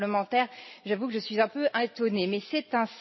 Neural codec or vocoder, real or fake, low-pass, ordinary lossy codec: none; real; 7.2 kHz; MP3, 24 kbps